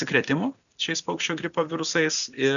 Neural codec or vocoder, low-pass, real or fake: none; 7.2 kHz; real